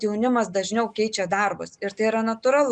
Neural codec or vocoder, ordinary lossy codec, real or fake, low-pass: none; Opus, 64 kbps; real; 9.9 kHz